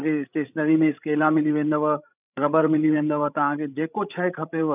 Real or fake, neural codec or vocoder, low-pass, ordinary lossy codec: fake; codec, 16 kHz, 16 kbps, FreqCodec, larger model; 3.6 kHz; none